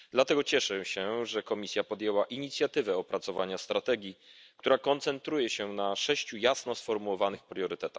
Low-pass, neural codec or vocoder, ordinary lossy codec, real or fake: none; none; none; real